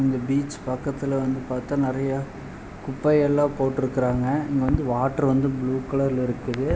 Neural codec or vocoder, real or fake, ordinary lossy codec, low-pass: none; real; none; none